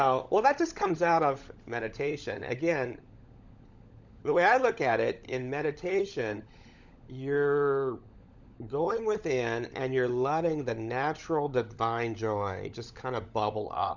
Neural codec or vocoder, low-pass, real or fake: codec, 16 kHz, 16 kbps, FunCodec, trained on LibriTTS, 50 frames a second; 7.2 kHz; fake